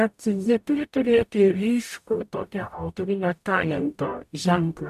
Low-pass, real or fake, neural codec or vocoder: 14.4 kHz; fake; codec, 44.1 kHz, 0.9 kbps, DAC